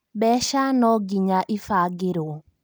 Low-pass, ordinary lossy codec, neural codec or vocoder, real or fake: none; none; none; real